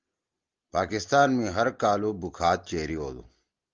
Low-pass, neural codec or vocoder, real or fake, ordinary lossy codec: 7.2 kHz; none; real; Opus, 32 kbps